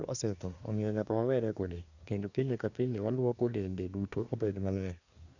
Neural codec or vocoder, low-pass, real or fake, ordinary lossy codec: codec, 24 kHz, 1 kbps, SNAC; 7.2 kHz; fake; none